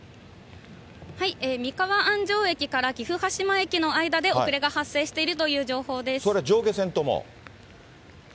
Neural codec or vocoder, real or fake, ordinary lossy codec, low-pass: none; real; none; none